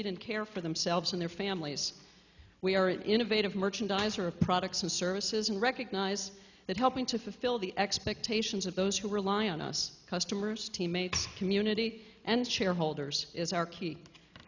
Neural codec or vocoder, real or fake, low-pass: none; real; 7.2 kHz